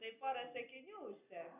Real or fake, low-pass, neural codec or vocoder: real; 3.6 kHz; none